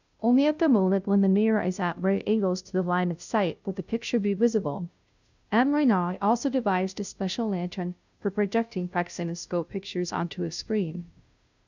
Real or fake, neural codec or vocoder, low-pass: fake; codec, 16 kHz, 0.5 kbps, FunCodec, trained on Chinese and English, 25 frames a second; 7.2 kHz